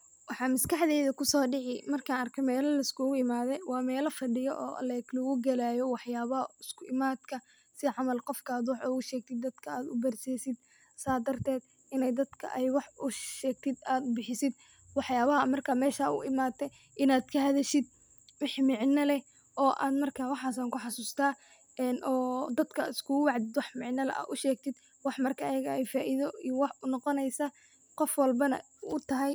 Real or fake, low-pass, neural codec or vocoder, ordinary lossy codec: real; none; none; none